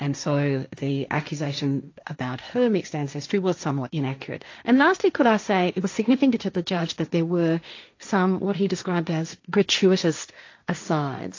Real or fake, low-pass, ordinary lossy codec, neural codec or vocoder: fake; 7.2 kHz; AAC, 48 kbps; codec, 16 kHz, 1.1 kbps, Voila-Tokenizer